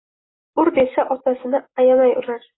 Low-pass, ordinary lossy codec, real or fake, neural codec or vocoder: 7.2 kHz; AAC, 16 kbps; real; none